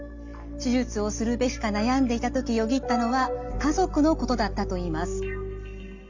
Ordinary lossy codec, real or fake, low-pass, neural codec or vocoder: none; real; 7.2 kHz; none